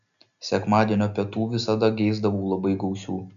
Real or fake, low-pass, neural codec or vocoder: real; 7.2 kHz; none